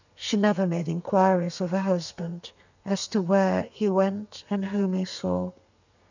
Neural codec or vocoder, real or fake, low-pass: codec, 32 kHz, 1.9 kbps, SNAC; fake; 7.2 kHz